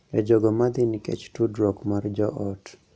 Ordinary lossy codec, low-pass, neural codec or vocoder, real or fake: none; none; none; real